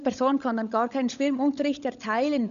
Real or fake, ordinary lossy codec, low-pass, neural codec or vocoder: fake; none; 7.2 kHz; codec, 16 kHz, 16 kbps, FunCodec, trained on LibriTTS, 50 frames a second